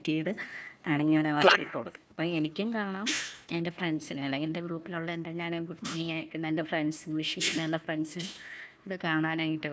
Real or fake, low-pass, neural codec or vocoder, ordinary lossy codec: fake; none; codec, 16 kHz, 1 kbps, FunCodec, trained on Chinese and English, 50 frames a second; none